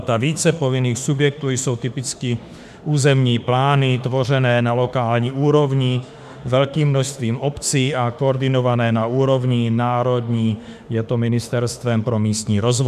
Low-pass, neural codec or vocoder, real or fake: 14.4 kHz; autoencoder, 48 kHz, 32 numbers a frame, DAC-VAE, trained on Japanese speech; fake